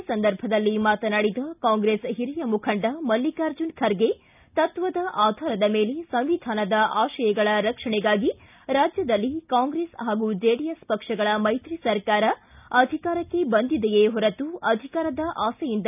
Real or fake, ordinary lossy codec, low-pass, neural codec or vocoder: real; none; 3.6 kHz; none